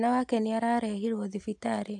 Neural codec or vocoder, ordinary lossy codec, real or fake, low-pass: none; AAC, 64 kbps; real; 10.8 kHz